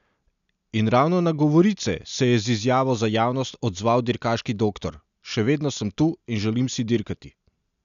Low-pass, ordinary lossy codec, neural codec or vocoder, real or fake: 7.2 kHz; none; none; real